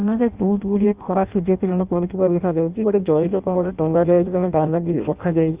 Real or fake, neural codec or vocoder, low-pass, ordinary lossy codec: fake; codec, 16 kHz in and 24 kHz out, 0.6 kbps, FireRedTTS-2 codec; 3.6 kHz; Opus, 64 kbps